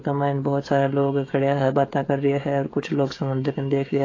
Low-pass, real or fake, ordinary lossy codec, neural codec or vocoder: 7.2 kHz; fake; AAC, 32 kbps; codec, 16 kHz, 16 kbps, FreqCodec, smaller model